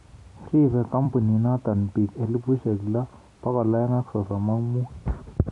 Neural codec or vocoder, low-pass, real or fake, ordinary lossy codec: vocoder, 44.1 kHz, 128 mel bands every 256 samples, BigVGAN v2; 10.8 kHz; fake; none